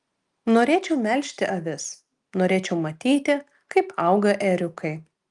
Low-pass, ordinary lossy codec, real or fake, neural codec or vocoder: 10.8 kHz; Opus, 32 kbps; real; none